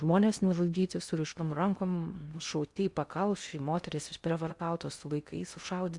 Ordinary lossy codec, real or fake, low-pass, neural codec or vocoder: Opus, 64 kbps; fake; 10.8 kHz; codec, 16 kHz in and 24 kHz out, 0.6 kbps, FocalCodec, streaming, 2048 codes